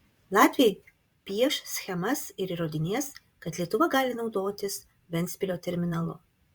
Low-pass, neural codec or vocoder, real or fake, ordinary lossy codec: 19.8 kHz; vocoder, 44.1 kHz, 128 mel bands every 512 samples, BigVGAN v2; fake; Opus, 64 kbps